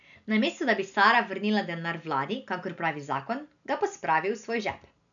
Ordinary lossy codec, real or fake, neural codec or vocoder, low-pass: none; real; none; 7.2 kHz